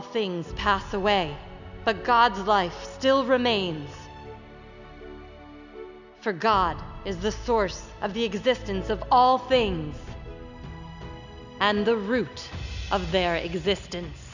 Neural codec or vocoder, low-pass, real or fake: none; 7.2 kHz; real